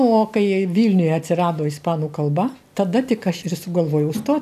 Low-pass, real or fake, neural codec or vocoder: 14.4 kHz; real; none